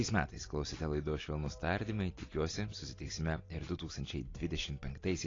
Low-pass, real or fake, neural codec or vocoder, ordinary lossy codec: 7.2 kHz; real; none; AAC, 32 kbps